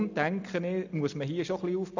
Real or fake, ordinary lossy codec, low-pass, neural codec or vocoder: real; none; 7.2 kHz; none